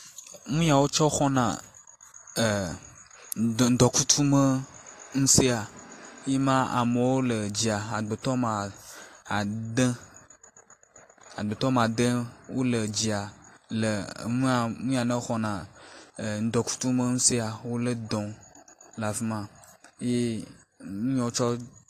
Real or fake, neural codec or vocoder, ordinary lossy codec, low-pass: real; none; AAC, 48 kbps; 14.4 kHz